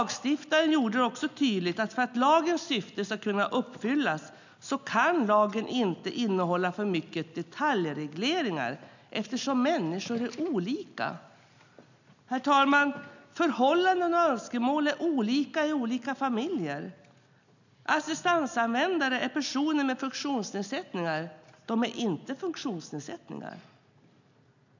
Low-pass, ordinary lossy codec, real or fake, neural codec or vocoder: 7.2 kHz; none; real; none